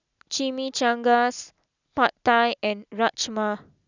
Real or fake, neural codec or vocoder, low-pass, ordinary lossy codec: real; none; 7.2 kHz; none